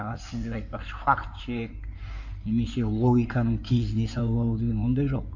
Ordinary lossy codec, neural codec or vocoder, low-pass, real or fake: none; codec, 16 kHz in and 24 kHz out, 2.2 kbps, FireRedTTS-2 codec; 7.2 kHz; fake